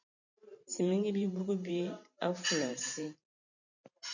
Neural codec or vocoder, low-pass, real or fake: vocoder, 44.1 kHz, 128 mel bands every 512 samples, BigVGAN v2; 7.2 kHz; fake